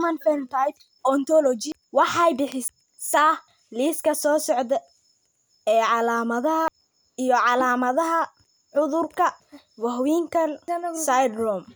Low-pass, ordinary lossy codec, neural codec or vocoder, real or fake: none; none; none; real